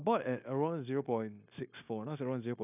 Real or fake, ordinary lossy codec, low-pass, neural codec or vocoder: fake; none; 3.6 kHz; codec, 16 kHz, 0.9 kbps, LongCat-Audio-Codec